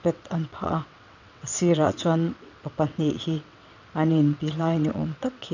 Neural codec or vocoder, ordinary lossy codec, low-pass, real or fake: vocoder, 22.05 kHz, 80 mel bands, WaveNeXt; none; 7.2 kHz; fake